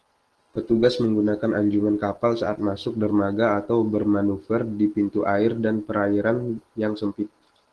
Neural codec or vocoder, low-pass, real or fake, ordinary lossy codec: none; 10.8 kHz; real; Opus, 16 kbps